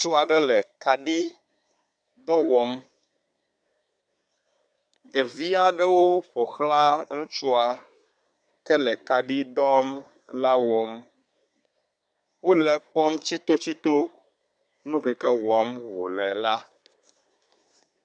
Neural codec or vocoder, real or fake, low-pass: codec, 24 kHz, 1 kbps, SNAC; fake; 9.9 kHz